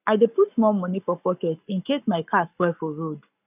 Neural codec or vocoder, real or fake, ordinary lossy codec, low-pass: codec, 44.1 kHz, 7.8 kbps, Pupu-Codec; fake; none; 3.6 kHz